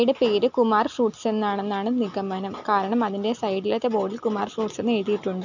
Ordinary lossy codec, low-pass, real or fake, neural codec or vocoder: none; 7.2 kHz; real; none